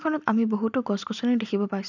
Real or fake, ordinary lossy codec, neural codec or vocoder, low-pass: real; none; none; 7.2 kHz